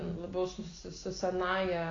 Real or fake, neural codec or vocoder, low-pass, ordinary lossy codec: real; none; 7.2 kHz; AAC, 32 kbps